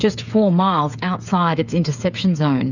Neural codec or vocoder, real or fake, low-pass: codec, 16 kHz, 8 kbps, FreqCodec, smaller model; fake; 7.2 kHz